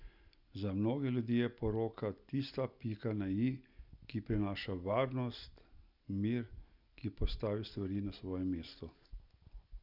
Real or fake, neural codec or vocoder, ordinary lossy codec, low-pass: real; none; none; 5.4 kHz